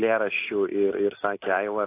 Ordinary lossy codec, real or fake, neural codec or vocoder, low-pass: AAC, 24 kbps; real; none; 3.6 kHz